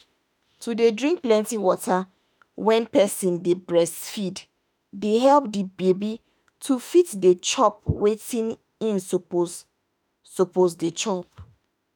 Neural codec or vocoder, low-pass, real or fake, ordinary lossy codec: autoencoder, 48 kHz, 32 numbers a frame, DAC-VAE, trained on Japanese speech; none; fake; none